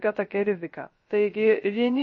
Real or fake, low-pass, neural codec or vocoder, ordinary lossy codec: fake; 7.2 kHz; codec, 16 kHz, 0.3 kbps, FocalCodec; MP3, 32 kbps